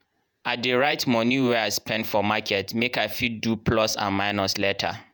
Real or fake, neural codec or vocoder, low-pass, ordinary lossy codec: fake; vocoder, 48 kHz, 128 mel bands, Vocos; none; none